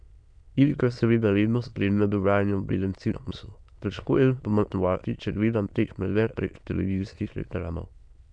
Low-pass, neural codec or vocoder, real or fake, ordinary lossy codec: 9.9 kHz; autoencoder, 22.05 kHz, a latent of 192 numbers a frame, VITS, trained on many speakers; fake; none